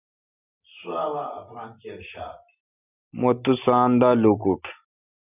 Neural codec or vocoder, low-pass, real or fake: none; 3.6 kHz; real